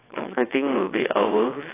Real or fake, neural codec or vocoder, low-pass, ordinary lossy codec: fake; vocoder, 22.05 kHz, 80 mel bands, WaveNeXt; 3.6 kHz; AAC, 16 kbps